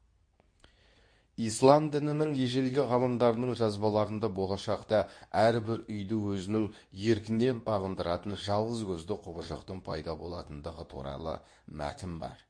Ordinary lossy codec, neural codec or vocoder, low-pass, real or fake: MP3, 48 kbps; codec, 24 kHz, 0.9 kbps, WavTokenizer, medium speech release version 2; 9.9 kHz; fake